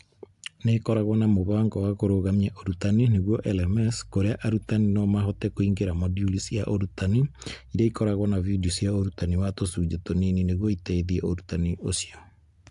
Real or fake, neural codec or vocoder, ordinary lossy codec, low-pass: real; none; AAC, 64 kbps; 10.8 kHz